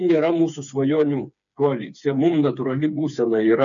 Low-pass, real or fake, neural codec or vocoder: 7.2 kHz; fake; codec, 16 kHz, 4 kbps, FreqCodec, smaller model